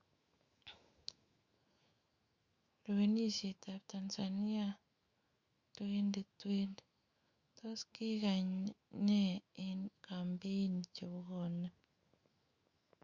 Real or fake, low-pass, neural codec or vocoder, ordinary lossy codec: fake; 7.2 kHz; autoencoder, 48 kHz, 128 numbers a frame, DAC-VAE, trained on Japanese speech; Opus, 64 kbps